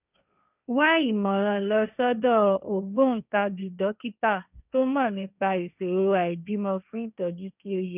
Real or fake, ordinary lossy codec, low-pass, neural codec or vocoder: fake; none; 3.6 kHz; codec, 16 kHz, 1.1 kbps, Voila-Tokenizer